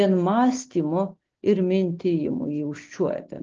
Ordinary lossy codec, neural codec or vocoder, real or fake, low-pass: Opus, 16 kbps; none; real; 7.2 kHz